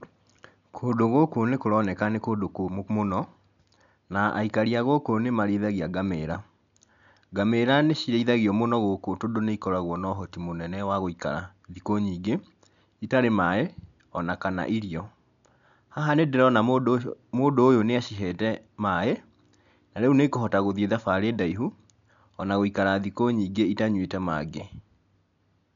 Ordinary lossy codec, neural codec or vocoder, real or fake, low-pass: none; none; real; 7.2 kHz